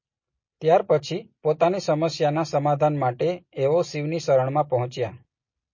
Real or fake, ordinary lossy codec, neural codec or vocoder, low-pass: real; MP3, 32 kbps; none; 7.2 kHz